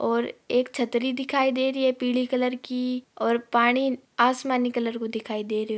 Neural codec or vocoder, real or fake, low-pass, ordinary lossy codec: none; real; none; none